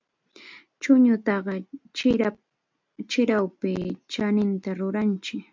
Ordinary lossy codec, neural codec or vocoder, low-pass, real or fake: MP3, 64 kbps; none; 7.2 kHz; real